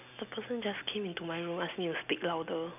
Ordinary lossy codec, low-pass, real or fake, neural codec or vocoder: none; 3.6 kHz; real; none